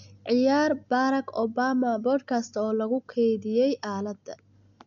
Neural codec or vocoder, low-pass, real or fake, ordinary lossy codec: none; 7.2 kHz; real; none